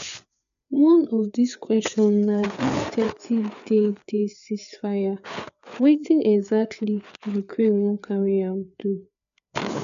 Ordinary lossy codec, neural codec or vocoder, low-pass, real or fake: MP3, 96 kbps; codec, 16 kHz, 4 kbps, FreqCodec, larger model; 7.2 kHz; fake